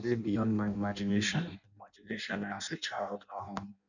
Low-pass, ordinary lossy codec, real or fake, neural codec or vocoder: 7.2 kHz; none; fake; codec, 16 kHz in and 24 kHz out, 0.6 kbps, FireRedTTS-2 codec